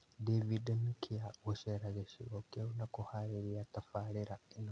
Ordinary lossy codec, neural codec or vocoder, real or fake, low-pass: none; none; real; 9.9 kHz